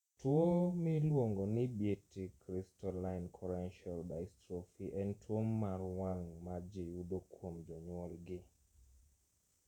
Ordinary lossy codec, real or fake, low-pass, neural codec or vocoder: none; fake; 19.8 kHz; vocoder, 48 kHz, 128 mel bands, Vocos